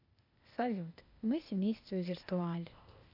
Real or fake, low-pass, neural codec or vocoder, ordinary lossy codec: fake; 5.4 kHz; codec, 16 kHz, 0.8 kbps, ZipCodec; none